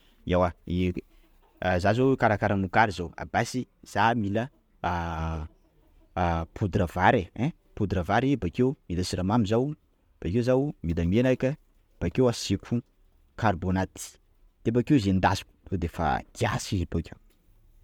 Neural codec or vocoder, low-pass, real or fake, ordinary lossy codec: none; 19.8 kHz; real; MP3, 96 kbps